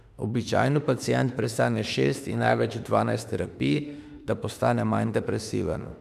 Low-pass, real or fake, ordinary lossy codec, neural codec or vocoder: 14.4 kHz; fake; none; autoencoder, 48 kHz, 32 numbers a frame, DAC-VAE, trained on Japanese speech